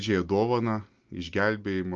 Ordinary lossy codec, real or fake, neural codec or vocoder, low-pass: Opus, 24 kbps; real; none; 7.2 kHz